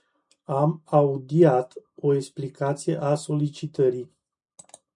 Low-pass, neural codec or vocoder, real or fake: 10.8 kHz; none; real